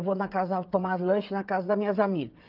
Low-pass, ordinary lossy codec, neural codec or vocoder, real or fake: 5.4 kHz; Opus, 32 kbps; codec, 16 kHz, 16 kbps, FreqCodec, smaller model; fake